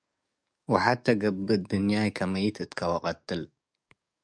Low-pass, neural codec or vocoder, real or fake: 9.9 kHz; codec, 44.1 kHz, 7.8 kbps, DAC; fake